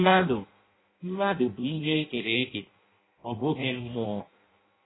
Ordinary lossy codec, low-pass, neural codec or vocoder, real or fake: AAC, 16 kbps; 7.2 kHz; codec, 16 kHz in and 24 kHz out, 0.6 kbps, FireRedTTS-2 codec; fake